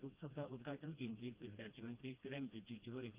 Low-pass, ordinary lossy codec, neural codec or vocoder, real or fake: 3.6 kHz; Opus, 32 kbps; codec, 16 kHz, 1 kbps, FreqCodec, smaller model; fake